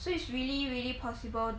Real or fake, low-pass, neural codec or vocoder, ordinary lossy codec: real; none; none; none